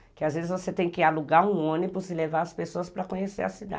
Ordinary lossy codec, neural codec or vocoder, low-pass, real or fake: none; none; none; real